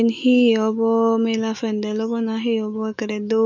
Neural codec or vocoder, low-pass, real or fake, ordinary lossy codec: autoencoder, 48 kHz, 128 numbers a frame, DAC-VAE, trained on Japanese speech; 7.2 kHz; fake; none